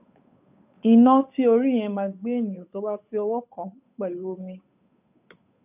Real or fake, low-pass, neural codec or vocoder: fake; 3.6 kHz; codec, 16 kHz, 8 kbps, FunCodec, trained on Chinese and English, 25 frames a second